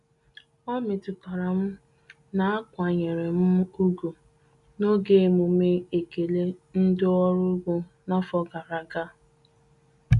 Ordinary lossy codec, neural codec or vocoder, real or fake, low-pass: none; none; real; 10.8 kHz